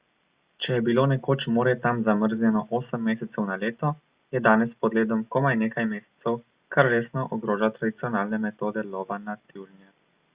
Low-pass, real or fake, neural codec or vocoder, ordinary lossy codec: 3.6 kHz; real; none; Opus, 64 kbps